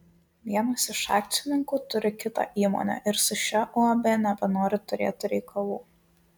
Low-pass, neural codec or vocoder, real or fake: 19.8 kHz; none; real